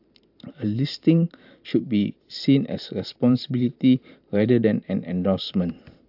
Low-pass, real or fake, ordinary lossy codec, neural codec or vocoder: 5.4 kHz; fake; AAC, 48 kbps; vocoder, 44.1 kHz, 128 mel bands every 512 samples, BigVGAN v2